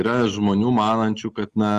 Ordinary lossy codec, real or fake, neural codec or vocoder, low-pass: Opus, 32 kbps; real; none; 14.4 kHz